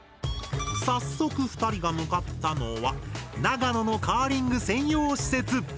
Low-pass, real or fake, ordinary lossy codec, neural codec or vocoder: none; real; none; none